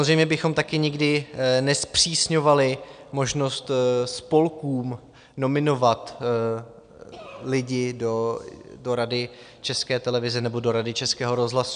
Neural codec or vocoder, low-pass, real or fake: none; 9.9 kHz; real